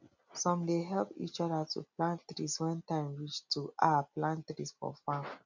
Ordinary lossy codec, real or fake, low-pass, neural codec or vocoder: none; real; 7.2 kHz; none